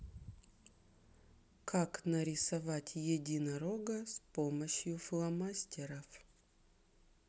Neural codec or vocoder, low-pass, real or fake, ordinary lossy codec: none; none; real; none